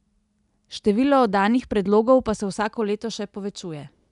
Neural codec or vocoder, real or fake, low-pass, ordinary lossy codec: none; real; 10.8 kHz; none